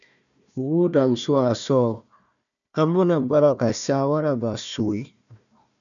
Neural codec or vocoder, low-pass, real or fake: codec, 16 kHz, 1 kbps, FunCodec, trained on Chinese and English, 50 frames a second; 7.2 kHz; fake